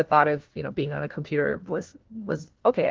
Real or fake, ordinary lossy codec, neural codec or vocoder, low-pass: fake; Opus, 16 kbps; codec, 16 kHz, 1 kbps, FunCodec, trained on LibriTTS, 50 frames a second; 7.2 kHz